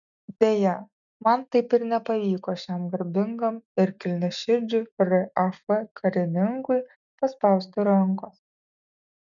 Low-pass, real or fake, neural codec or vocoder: 7.2 kHz; real; none